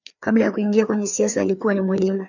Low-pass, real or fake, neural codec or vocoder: 7.2 kHz; fake; codec, 16 kHz, 2 kbps, FreqCodec, larger model